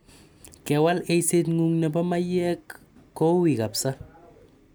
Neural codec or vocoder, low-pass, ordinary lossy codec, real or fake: none; none; none; real